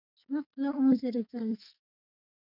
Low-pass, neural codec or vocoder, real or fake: 5.4 kHz; codec, 44.1 kHz, 2.6 kbps, SNAC; fake